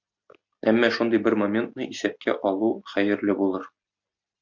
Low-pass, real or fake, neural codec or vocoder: 7.2 kHz; real; none